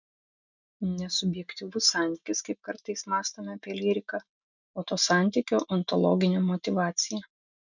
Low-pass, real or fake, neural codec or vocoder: 7.2 kHz; real; none